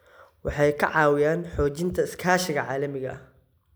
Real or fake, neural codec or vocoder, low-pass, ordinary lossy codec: real; none; none; none